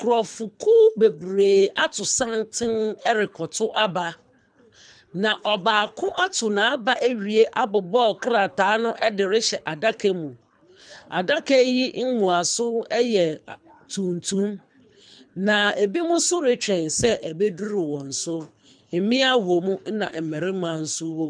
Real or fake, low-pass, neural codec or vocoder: fake; 9.9 kHz; codec, 24 kHz, 3 kbps, HILCodec